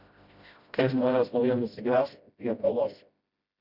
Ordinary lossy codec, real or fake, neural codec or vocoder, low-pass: Opus, 64 kbps; fake; codec, 16 kHz, 0.5 kbps, FreqCodec, smaller model; 5.4 kHz